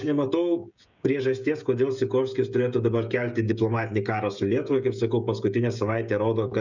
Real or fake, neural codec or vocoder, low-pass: fake; codec, 16 kHz, 16 kbps, FreqCodec, smaller model; 7.2 kHz